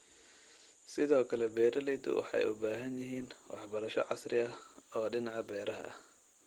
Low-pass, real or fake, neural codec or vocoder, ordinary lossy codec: 19.8 kHz; real; none; Opus, 16 kbps